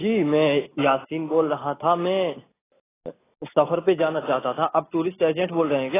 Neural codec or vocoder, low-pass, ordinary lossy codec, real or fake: none; 3.6 kHz; AAC, 16 kbps; real